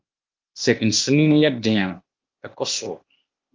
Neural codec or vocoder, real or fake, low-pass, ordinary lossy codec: codec, 16 kHz, 0.8 kbps, ZipCodec; fake; 7.2 kHz; Opus, 24 kbps